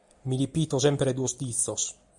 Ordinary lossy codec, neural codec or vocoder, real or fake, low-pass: Opus, 64 kbps; none; real; 10.8 kHz